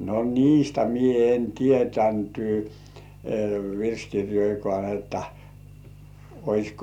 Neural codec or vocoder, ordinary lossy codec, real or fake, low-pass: none; none; real; 19.8 kHz